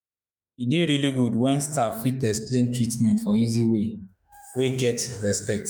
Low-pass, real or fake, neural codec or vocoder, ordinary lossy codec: none; fake; autoencoder, 48 kHz, 32 numbers a frame, DAC-VAE, trained on Japanese speech; none